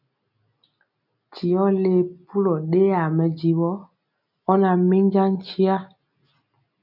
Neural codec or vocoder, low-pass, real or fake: none; 5.4 kHz; real